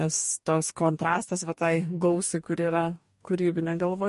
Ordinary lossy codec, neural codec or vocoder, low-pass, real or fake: MP3, 48 kbps; codec, 44.1 kHz, 2.6 kbps, DAC; 14.4 kHz; fake